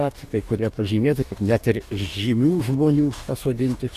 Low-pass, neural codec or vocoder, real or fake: 14.4 kHz; codec, 44.1 kHz, 2.6 kbps, DAC; fake